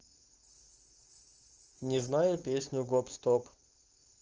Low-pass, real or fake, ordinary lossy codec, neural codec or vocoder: 7.2 kHz; fake; Opus, 24 kbps; codec, 16 kHz, 4.8 kbps, FACodec